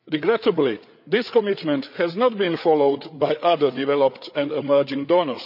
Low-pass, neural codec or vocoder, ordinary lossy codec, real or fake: 5.4 kHz; codec, 16 kHz, 8 kbps, FreqCodec, larger model; none; fake